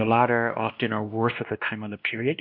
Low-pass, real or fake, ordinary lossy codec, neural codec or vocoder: 5.4 kHz; fake; MP3, 48 kbps; codec, 16 kHz, 1 kbps, X-Codec, WavLM features, trained on Multilingual LibriSpeech